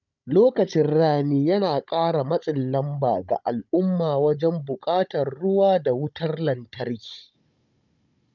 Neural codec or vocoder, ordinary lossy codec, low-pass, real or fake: codec, 16 kHz, 16 kbps, FunCodec, trained on Chinese and English, 50 frames a second; none; 7.2 kHz; fake